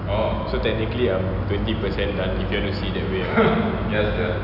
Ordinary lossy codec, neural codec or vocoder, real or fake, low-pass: none; none; real; 5.4 kHz